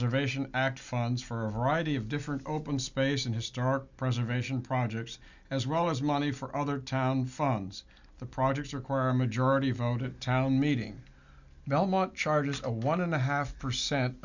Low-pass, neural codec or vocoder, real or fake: 7.2 kHz; none; real